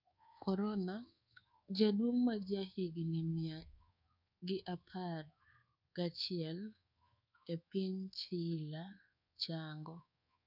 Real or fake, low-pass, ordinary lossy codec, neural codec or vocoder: fake; 5.4 kHz; none; codec, 24 kHz, 1.2 kbps, DualCodec